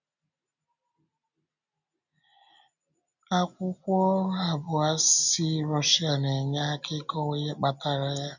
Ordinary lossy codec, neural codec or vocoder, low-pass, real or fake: none; none; 7.2 kHz; real